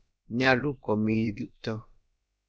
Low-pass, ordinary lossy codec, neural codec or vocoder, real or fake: none; none; codec, 16 kHz, about 1 kbps, DyCAST, with the encoder's durations; fake